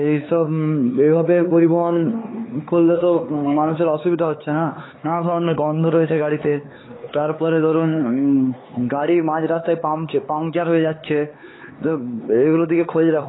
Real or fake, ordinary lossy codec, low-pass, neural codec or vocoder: fake; AAC, 16 kbps; 7.2 kHz; codec, 16 kHz, 4 kbps, X-Codec, HuBERT features, trained on LibriSpeech